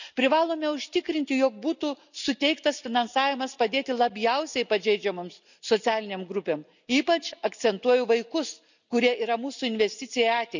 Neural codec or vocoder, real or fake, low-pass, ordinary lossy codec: none; real; 7.2 kHz; none